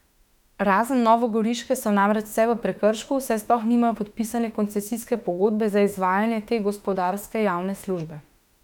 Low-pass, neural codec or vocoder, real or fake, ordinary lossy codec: 19.8 kHz; autoencoder, 48 kHz, 32 numbers a frame, DAC-VAE, trained on Japanese speech; fake; none